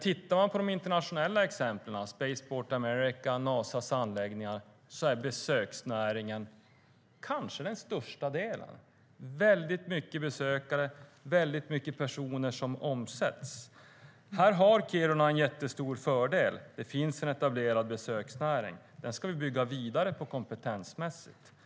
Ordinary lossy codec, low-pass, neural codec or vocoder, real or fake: none; none; none; real